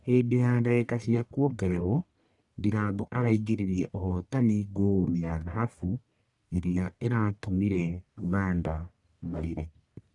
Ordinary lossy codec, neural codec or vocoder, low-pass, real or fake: none; codec, 44.1 kHz, 1.7 kbps, Pupu-Codec; 10.8 kHz; fake